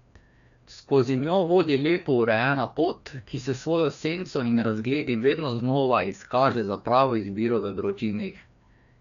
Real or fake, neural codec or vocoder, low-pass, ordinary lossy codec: fake; codec, 16 kHz, 1 kbps, FreqCodec, larger model; 7.2 kHz; none